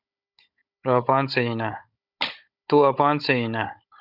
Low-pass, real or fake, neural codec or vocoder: 5.4 kHz; fake; codec, 16 kHz, 16 kbps, FunCodec, trained on Chinese and English, 50 frames a second